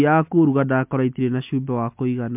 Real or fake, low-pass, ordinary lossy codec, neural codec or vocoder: real; 3.6 kHz; MP3, 32 kbps; none